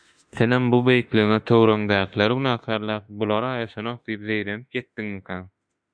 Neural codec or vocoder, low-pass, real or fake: autoencoder, 48 kHz, 32 numbers a frame, DAC-VAE, trained on Japanese speech; 9.9 kHz; fake